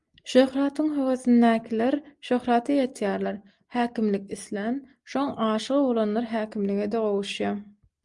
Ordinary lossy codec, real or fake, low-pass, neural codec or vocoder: Opus, 32 kbps; real; 10.8 kHz; none